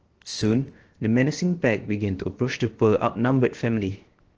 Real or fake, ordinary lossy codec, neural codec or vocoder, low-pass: fake; Opus, 16 kbps; codec, 16 kHz, 0.7 kbps, FocalCodec; 7.2 kHz